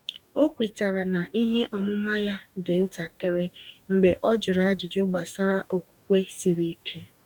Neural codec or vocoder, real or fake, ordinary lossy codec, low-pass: codec, 44.1 kHz, 2.6 kbps, DAC; fake; none; 19.8 kHz